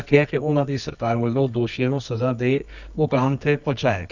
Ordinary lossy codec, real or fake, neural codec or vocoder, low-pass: none; fake; codec, 24 kHz, 0.9 kbps, WavTokenizer, medium music audio release; 7.2 kHz